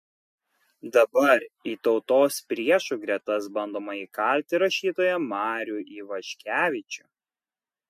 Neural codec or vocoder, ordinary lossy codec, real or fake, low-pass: none; MP3, 64 kbps; real; 14.4 kHz